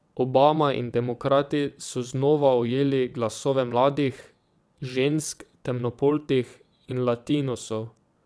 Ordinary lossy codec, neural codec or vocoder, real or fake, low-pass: none; vocoder, 22.05 kHz, 80 mel bands, WaveNeXt; fake; none